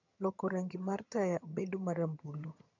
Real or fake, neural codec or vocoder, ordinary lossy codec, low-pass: fake; vocoder, 22.05 kHz, 80 mel bands, HiFi-GAN; none; 7.2 kHz